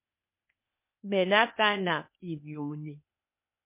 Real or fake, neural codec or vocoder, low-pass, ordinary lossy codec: fake; codec, 16 kHz, 0.8 kbps, ZipCodec; 3.6 kHz; MP3, 32 kbps